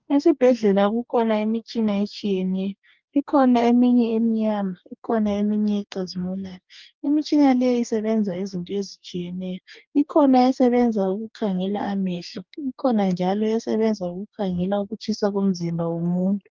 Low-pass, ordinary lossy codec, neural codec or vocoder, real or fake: 7.2 kHz; Opus, 32 kbps; codec, 44.1 kHz, 2.6 kbps, DAC; fake